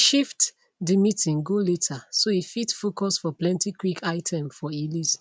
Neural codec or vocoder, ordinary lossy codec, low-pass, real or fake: none; none; none; real